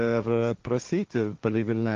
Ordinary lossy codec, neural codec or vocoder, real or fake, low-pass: Opus, 32 kbps; codec, 16 kHz, 1.1 kbps, Voila-Tokenizer; fake; 7.2 kHz